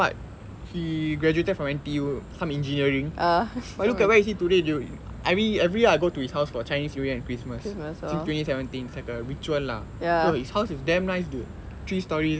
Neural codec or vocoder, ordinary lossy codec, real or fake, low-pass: none; none; real; none